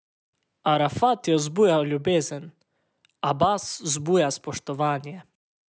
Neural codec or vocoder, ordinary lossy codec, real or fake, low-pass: none; none; real; none